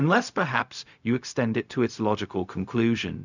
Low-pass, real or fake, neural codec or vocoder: 7.2 kHz; fake; codec, 16 kHz, 0.4 kbps, LongCat-Audio-Codec